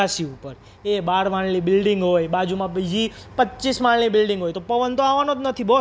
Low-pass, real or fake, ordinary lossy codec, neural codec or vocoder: none; real; none; none